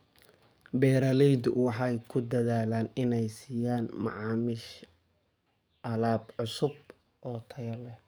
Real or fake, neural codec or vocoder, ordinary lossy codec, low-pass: fake; codec, 44.1 kHz, 7.8 kbps, DAC; none; none